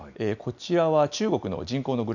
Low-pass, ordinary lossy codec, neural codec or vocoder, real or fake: 7.2 kHz; none; none; real